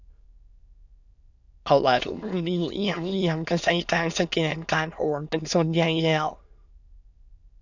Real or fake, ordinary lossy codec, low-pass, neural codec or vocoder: fake; none; 7.2 kHz; autoencoder, 22.05 kHz, a latent of 192 numbers a frame, VITS, trained on many speakers